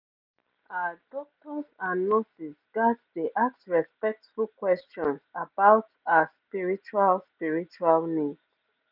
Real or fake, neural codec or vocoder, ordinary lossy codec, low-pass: real; none; none; 5.4 kHz